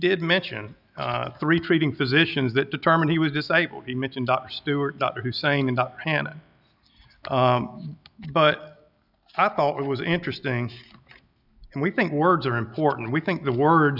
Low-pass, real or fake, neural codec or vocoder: 5.4 kHz; real; none